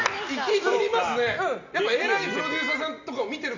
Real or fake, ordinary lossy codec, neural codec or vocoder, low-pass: real; none; none; 7.2 kHz